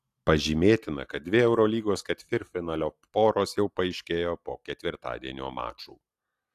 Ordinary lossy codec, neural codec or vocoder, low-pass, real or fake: AAC, 64 kbps; none; 14.4 kHz; real